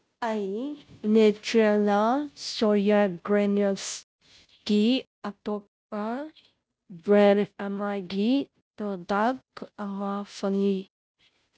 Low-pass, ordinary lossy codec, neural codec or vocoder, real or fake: none; none; codec, 16 kHz, 0.5 kbps, FunCodec, trained on Chinese and English, 25 frames a second; fake